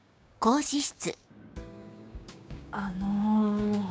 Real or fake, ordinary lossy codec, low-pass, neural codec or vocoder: fake; none; none; codec, 16 kHz, 6 kbps, DAC